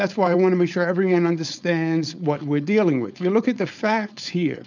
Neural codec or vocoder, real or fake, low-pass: codec, 16 kHz, 4.8 kbps, FACodec; fake; 7.2 kHz